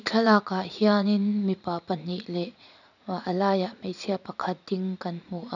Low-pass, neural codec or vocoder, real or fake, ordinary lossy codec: 7.2 kHz; vocoder, 44.1 kHz, 80 mel bands, Vocos; fake; none